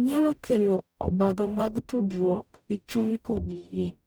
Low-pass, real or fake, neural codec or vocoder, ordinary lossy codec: none; fake; codec, 44.1 kHz, 0.9 kbps, DAC; none